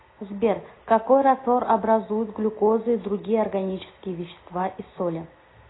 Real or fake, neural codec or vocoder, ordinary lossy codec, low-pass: real; none; AAC, 16 kbps; 7.2 kHz